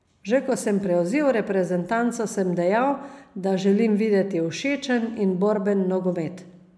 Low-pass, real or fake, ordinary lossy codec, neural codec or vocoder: none; real; none; none